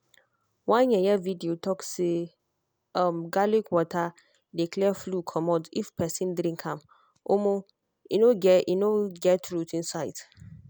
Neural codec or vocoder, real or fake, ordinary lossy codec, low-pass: none; real; none; none